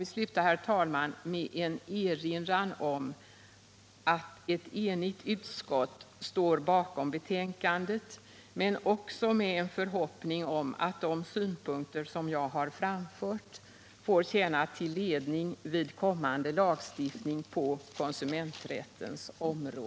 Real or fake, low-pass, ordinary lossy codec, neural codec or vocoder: real; none; none; none